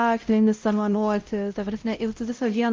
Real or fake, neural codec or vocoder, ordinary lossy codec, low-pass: fake; codec, 16 kHz, 0.5 kbps, X-Codec, WavLM features, trained on Multilingual LibriSpeech; Opus, 24 kbps; 7.2 kHz